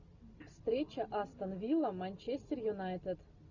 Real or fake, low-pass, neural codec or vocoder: real; 7.2 kHz; none